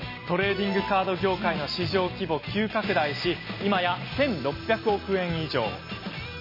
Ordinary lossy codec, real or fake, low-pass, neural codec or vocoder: MP3, 24 kbps; real; 5.4 kHz; none